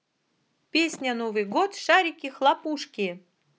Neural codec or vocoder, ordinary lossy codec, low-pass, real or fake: none; none; none; real